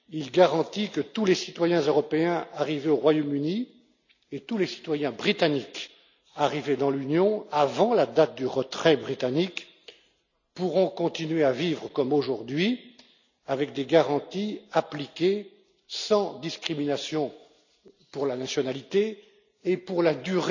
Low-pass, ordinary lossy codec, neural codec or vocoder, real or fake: 7.2 kHz; none; none; real